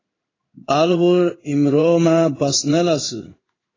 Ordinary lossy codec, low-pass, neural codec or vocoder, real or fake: AAC, 32 kbps; 7.2 kHz; codec, 16 kHz in and 24 kHz out, 1 kbps, XY-Tokenizer; fake